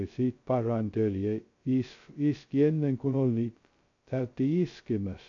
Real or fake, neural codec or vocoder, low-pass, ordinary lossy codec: fake; codec, 16 kHz, 0.2 kbps, FocalCodec; 7.2 kHz; AAC, 48 kbps